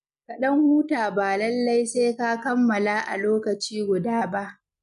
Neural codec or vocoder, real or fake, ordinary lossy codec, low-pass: none; real; none; 10.8 kHz